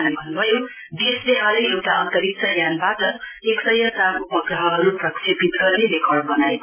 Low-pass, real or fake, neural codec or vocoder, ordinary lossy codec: 3.6 kHz; real; none; MP3, 16 kbps